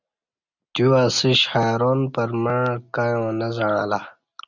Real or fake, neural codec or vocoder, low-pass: real; none; 7.2 kHz